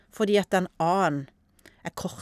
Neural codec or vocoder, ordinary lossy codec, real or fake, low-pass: none; none; real; 14.4 kHz